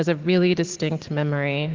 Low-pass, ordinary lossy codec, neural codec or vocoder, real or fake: 7.2 kHz; Opus, 16 kbps; none; real